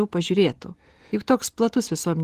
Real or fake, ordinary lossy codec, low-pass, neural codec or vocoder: real; Opus, 16 kbps; 14.4 kHz; none